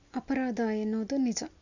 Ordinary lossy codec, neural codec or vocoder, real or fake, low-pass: none; none; real; 7.2 kHz